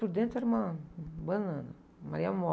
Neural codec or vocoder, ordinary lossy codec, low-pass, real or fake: none; none; none; real